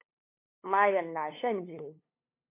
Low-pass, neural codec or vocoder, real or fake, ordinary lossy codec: 3.6 kHz; codec, 16 kHz, 2 kbps, FunCodec, trained on LibriTTS, 25 frames a second; fake; MP3, 24 kbps